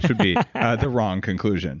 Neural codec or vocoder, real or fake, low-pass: none; real; 7.2 kHz